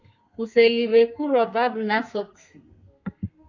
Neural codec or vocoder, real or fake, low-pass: codec, 32 kHz, 1.9 kbps, SNAC; fake; 7.2 kHz